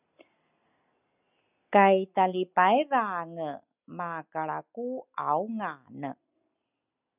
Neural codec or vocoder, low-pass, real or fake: none; 3.6 kHz; real